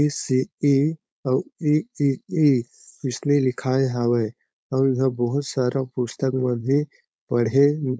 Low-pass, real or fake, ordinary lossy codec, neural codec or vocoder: none; fake; none; codec, 16 kHz, 4.8 kbps, FACodec